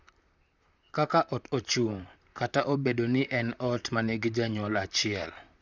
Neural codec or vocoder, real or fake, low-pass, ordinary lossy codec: vocoder, 44.1 kHz, 128 mel bands, Pupu-Vocoder; fake; 7.2 kHz; none